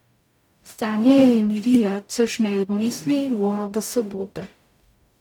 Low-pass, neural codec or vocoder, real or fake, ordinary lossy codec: 19.8 kHz; codec, 44.1 kHz, 0.9 kbps, DAC; fake; none